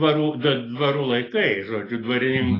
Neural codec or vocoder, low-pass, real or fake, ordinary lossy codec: none; 5.4 kHz; real; AAC, 24 kbps